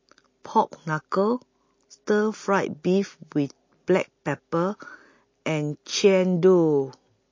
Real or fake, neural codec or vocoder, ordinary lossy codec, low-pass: real; none; MP3, 32 kbps; 7.2 kHz